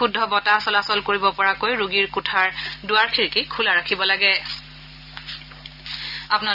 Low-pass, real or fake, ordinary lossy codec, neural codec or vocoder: 5.4 kHz; real; none; none